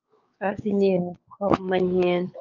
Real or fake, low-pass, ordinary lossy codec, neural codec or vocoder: fake; 7.2 kHz; Opus, 24 kbps; codec, 16 kHz, 4 kbps, X-Codec, WavLM features, trained on Multilingual LibriSpeech